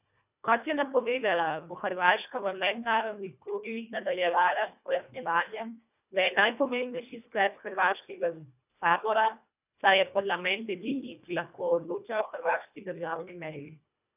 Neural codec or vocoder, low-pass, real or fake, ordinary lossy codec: codec, 24 kHz, 1.5 kbps, HILCodec; 3.6 kHz; fake; none